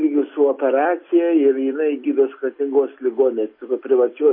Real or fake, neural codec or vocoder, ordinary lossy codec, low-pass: real; none; MP3, 24 kbps; 5.4 kHz